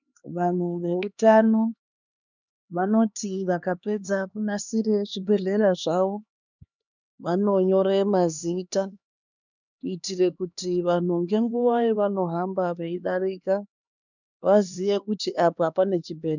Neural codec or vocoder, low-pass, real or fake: codec, 16 kHz, 2 kbps, X-Codec, HuBERT features, trained on LibriSpeech; 7.2 kHz; fake